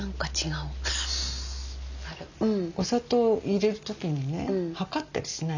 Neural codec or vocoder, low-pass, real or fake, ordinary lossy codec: none; 7.2 kHz; real; none